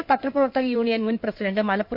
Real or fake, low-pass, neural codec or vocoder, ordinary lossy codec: fake; 5.4 kHz; codec, 16 kHz in and 24 kHz out, 2.2 kbps, FireRedTTS-2 codec; MP3, 48 kbps